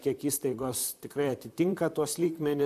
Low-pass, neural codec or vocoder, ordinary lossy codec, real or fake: 14.4 kHz; vocoder, 44.1 kHz, 128 mel bands, Pupu-Vocoder; MP3, 96 kbps; fake